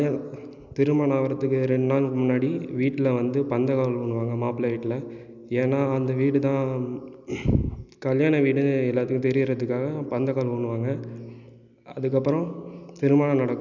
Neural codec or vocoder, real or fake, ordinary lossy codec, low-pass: none; real; none; 7.2 kHz